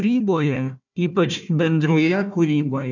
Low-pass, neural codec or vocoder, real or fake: 7.2 kHz; codec, 16 kHz, 1 kbps, FunCodec, trained on Chinese and English, 50 frames a second; fake